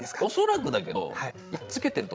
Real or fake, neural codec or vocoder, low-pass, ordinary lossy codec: fake; codec, 16 kHz, 16 kbps, FreqCodec, larger model; none; none